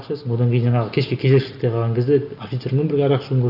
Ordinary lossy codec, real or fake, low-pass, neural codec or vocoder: none; real; 5.4 kHz; none